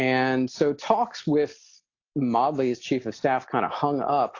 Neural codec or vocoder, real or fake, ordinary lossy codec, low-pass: none; real; AAC, 48 kbps; 7.2 kHz